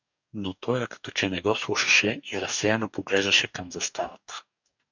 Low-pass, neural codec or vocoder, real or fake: 7.2 kHz; codec, 44.1 kHz, 2.6 kbps, DAC; fake